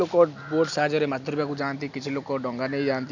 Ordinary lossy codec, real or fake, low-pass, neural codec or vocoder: none; real; 7.2 kHz; none